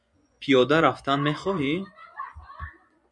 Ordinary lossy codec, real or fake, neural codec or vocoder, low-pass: MP3, 96 kbps; fake; vocoder, 24 kHz, 100 mel bands, Vocos; 10.8 kHz